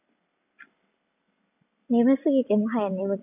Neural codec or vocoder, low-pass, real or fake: none; 3.6 kHz; real